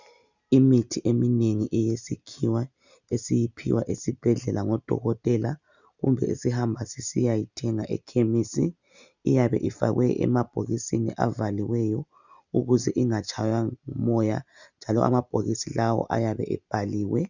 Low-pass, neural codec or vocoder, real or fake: 7.2 kHz; none; real